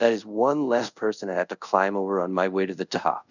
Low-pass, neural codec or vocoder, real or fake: 7.2 kHz; codec, 24 kHz, 0.5 kbps, DualCodec; fake